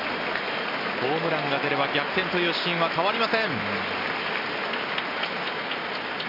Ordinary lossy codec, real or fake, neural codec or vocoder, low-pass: none; real; none; 5.4 kHz